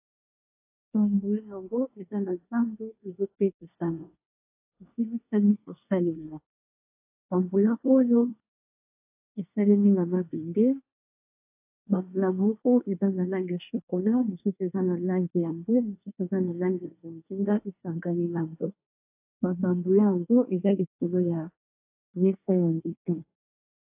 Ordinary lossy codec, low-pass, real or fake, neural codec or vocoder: AAC, 32 kbps; 3.6 kHz; fake; codec, 24 kHz, 1 kbps, SNAC